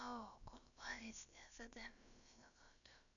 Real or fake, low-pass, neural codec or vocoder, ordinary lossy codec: fake; 7.2 kHz; codec, 16 kHz, about 1 kbps, DyCAST, with the encoder's durations; none